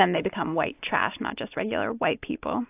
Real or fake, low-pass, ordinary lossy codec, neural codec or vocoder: real; 3.6 kHz; AAC, 32 kbps; none